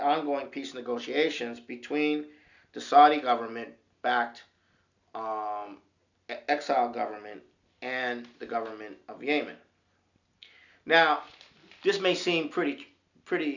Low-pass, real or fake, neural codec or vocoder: 7.2 kHz; real; none